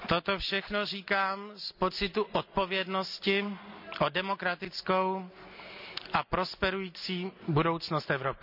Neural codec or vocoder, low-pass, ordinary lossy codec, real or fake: none; 5.4 kHz; none; real